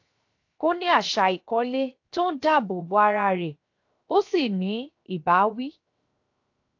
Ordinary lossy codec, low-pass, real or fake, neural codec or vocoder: AAC, 48 kbps; 7.2 kHz; fake; codec, 16 kHz, 0.7 kbps, FocalCodec